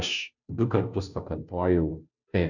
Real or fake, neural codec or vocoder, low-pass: fake; codec, 16 kHz, 0.5 kbps, FunCodec, trained on Chinese and English, 25 frames a second; 7.2 kHz